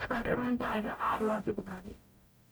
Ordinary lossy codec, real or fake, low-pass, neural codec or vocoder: none; fake; none; codec, 44.1 kHz, 0.9 kbps, DAC